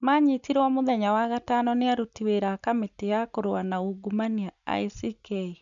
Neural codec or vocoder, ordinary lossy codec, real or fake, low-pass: none; none; real; 7.2 kHz